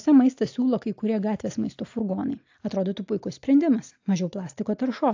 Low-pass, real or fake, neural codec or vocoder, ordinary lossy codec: 7.2 kHz; real; none; AAC, 48 kbps